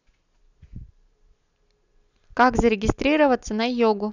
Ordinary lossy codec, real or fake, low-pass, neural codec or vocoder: none; real; 7.2 kHz; none